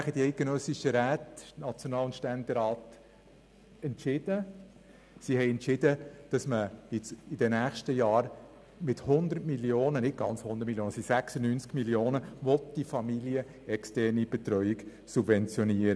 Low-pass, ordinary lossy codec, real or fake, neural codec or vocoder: none; none; real; none